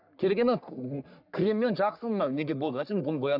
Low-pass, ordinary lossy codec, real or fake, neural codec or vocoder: 5.4 kHz; none; fake; codec, 44.1 kHz, 3.4 kbps, Pupu-Codec